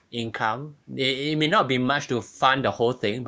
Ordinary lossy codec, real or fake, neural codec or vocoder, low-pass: none; fake; codec, 16 kHz, 6 kbps, DAC; none